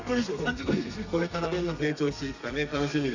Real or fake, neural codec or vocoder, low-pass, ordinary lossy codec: fake; codec, 32 kHz, 1.9 kbps, SNAC; 7.2 kHz; none